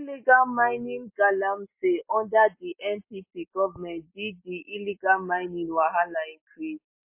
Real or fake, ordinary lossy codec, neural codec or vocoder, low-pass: real; MP3, 24 kbps; none; 3.6 kHz